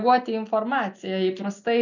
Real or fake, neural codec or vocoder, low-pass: real; none; 7.2 kHz